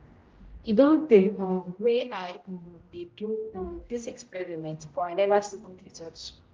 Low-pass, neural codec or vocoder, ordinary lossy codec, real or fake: 7.2 kHz; codec, 16 kHz, 0.5 kbps, X-Codec, HuBERT features, trained on general audio; Opus, 32 kbps; fake